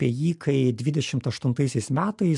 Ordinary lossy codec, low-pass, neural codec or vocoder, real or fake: MP3, 64 kbps; 10.8 kHz; none; real